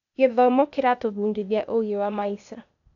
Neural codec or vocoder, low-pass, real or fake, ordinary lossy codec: codec, 16 kHz, 0.8 kbps, ZipCodec; 7.2 kHz; fake; none